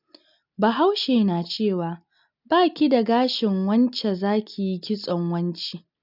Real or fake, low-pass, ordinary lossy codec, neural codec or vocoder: real; 5.4 kHz; none; none